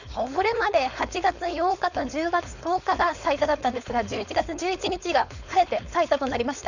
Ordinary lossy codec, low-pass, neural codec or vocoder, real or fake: none; 7.2 kHz; codec, 16 kHz, 4.8 kbps, FACodec; fake